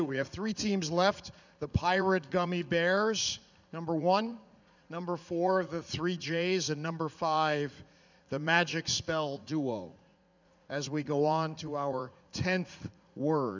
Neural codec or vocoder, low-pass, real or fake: vocoder, 44.1 kHz, 80 mel bands, Vocos; 7.2 kHz; fake